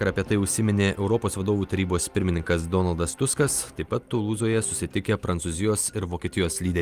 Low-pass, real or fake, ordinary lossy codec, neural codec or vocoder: 14.4 kHz; real; Opus, 32 kbps; none